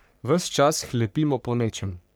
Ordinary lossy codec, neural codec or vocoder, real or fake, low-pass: none; codec, 44.1 kHz, 3.4 kbps, Pupu-Codec; fake; none